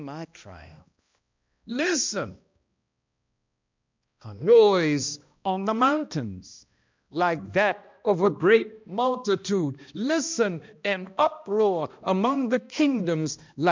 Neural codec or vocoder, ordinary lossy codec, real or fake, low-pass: codec, 16 kHz, 1 kbps, X-Codec, HuBERT features, trained on balanced general audio; MP3, 64 kbps; fake; 7.2 kHz